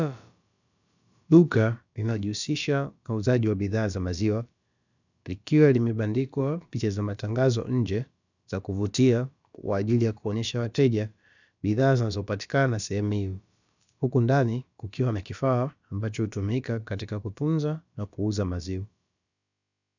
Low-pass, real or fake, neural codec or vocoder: 7.2 kHz; fake; codec, 16 kHz, about 1 kbps, DyCAST, with the encoder's durations